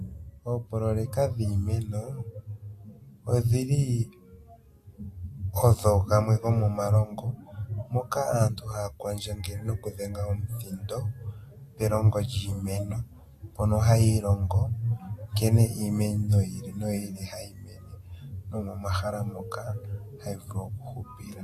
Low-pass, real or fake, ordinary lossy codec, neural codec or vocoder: 14.4 kHz; real; AAC, 64 kbps; none